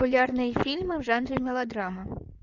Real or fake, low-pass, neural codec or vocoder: fake; 7.2 kHz; codec, 16 kHz in and 24 kHz out, 2.2 kbps, FireRedTTS-2 codec